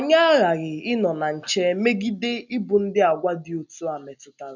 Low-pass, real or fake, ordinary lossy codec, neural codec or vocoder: 7.2 kHz; real; none; none